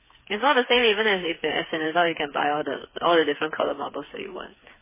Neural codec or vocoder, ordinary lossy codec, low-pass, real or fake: codec, 16 kHz, 4 kbps, FreqCodec, smaller model; MP3, 16 kbps; 3.6 kHz; fake